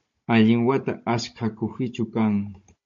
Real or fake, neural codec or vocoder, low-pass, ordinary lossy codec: fake; codec, 16 kHz, 16 kbps, FunCodec, trained on Chinese and English, 50 frames a second; 7.2 kHz; MP3, 48 kbps